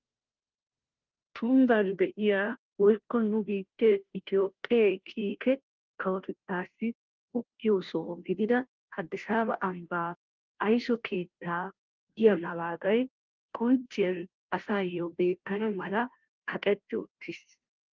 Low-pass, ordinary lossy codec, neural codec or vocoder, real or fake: 7.2 kHz; Opus, 32 kbps; codec, 16 kHz, 0.5 kbps, FunCodec, trained on Chinese and English, 25 frames a second; fake